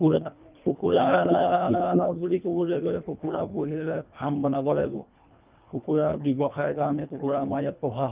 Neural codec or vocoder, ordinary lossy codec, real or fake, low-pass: codec, 24 kHz, 1.5 kbps, HILCodec; Opus, 24 kbps; fake; 3.6 kHz